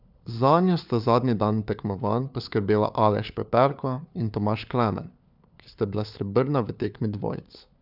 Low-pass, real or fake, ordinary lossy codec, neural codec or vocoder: 5.4 kHz; fake; none; codec, 16 kHz, 4 kbps, FunCodec, trained on LibriTTS, 50 frames a second